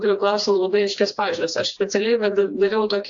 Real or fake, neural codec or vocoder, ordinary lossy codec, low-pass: fake; codec, 16 kHz, 2 kbps, FreqCodec, smaller model; AAC, 64 kbps; 7.2 kHz